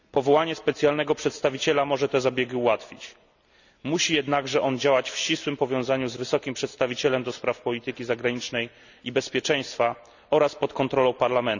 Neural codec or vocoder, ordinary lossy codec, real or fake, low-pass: none; none; real; 7.2 kHz